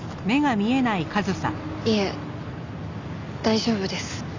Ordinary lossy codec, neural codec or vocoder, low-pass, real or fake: none; none; 7.2 kHz; real